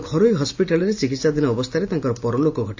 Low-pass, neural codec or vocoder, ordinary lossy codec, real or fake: 7.2 kHz; none; AAC, 48 kbps; real